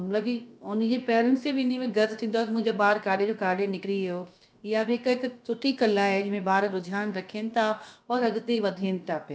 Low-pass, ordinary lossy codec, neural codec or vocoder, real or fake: none; none; codec, 16 kHz, about 1 kbps, DyCAST, with the encoder's durations; fake